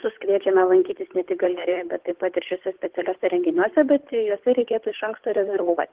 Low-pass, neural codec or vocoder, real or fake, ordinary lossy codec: 3.6 kHz; codec, 16 kHz, 8 kbps, FunCodec, trained on Chinese and English, 25 frames a second; fake; Opus, 16 kbps